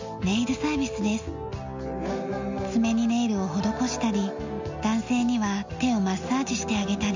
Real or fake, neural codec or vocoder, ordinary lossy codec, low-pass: real; none; MP3, 64 kbps; 7.2 kHz